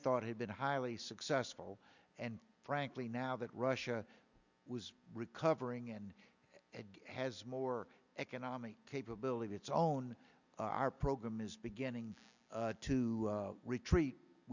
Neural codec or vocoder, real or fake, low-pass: none; real; 7.2 kHz